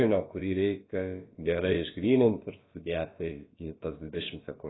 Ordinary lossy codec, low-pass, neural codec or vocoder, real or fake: AAC, 16 kbps; 7.2 kHz; codec, 16 kHz, about 1 kbps, DyCAST, with the encoder's durations; fake